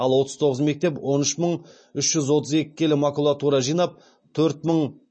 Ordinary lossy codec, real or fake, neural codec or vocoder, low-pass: MP3, 32 kbps; real; none; 9.9 kHz